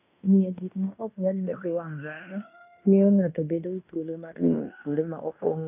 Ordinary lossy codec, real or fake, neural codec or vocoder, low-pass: none; fake; codec, 16 kHz in and 24 kHz out, 0.9 kbps, LongCat-Audio-Codec, fine tuned four codebook decoder; 3.6 kHz